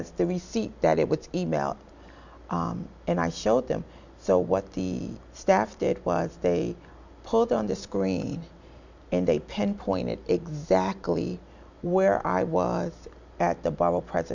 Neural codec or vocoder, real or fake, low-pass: none; real; 7.2 kHz